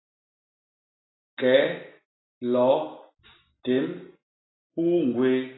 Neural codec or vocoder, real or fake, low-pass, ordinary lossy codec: none; real; 7.2 kHz; AAC, 16 kbps